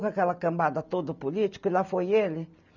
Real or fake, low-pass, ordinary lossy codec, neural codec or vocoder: real; 7.2 kHz; none; none